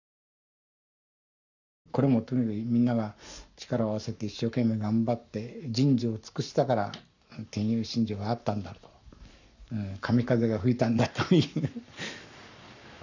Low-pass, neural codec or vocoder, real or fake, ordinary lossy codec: 7.2 kHz; codec, 44.1 kHz, 7.8 kbps, Pupu-Codec; fake; none